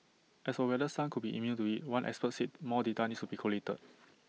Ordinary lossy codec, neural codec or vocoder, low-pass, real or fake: none; none; none; real